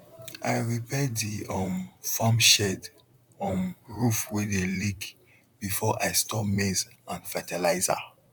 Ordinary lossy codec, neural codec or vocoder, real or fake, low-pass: none; vocoder, 44.1 kHz, 128 mel bands, Pupu-Vocoder; fake; 19.8 kHz